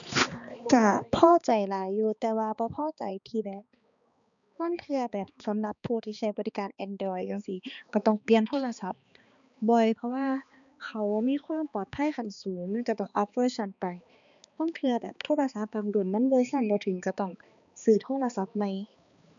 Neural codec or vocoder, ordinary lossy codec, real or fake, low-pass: codec, 16 kHz, 2 kbps, X-Codec, HuBERT features, trained on balanced general audio; none; fake; 7.2 kHz